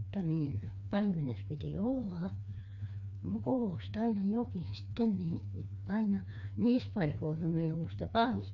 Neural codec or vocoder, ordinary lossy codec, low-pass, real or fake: codec, 16 kHz, 2 kbps, FreqCodec, larger model; none; 7.2 kHz; fake